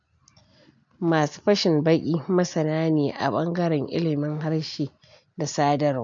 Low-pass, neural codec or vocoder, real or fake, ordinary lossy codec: 7.2 kHz; none; real; MP3, 64 kbps